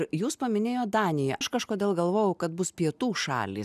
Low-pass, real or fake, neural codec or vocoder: 14.4 kHz; real; none